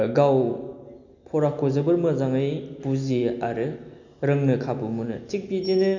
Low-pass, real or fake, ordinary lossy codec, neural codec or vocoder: 7.2 kHz; real; none; none